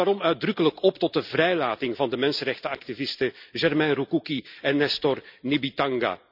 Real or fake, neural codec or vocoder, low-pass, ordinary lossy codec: real; none; 5.4 kHz; none